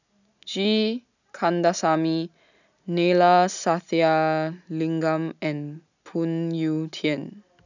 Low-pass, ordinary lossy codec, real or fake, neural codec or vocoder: 7.2 kHz; none; real; none